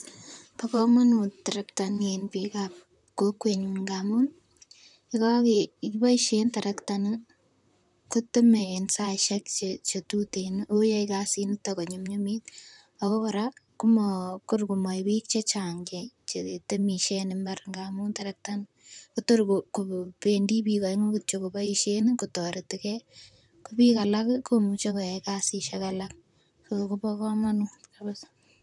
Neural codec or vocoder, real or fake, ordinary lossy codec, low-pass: vocoder, 44.1 kHz, 128 mel bands, Pupu-Vocoder; fake; none; 10.8 kHz